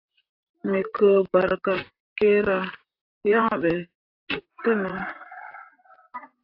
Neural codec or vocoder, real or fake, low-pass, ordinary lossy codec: vocoder, 44.1 kHz, 128 mel bands, Pupu-Vocoder; fake; 5.4 kHz; AAC, 32 kbps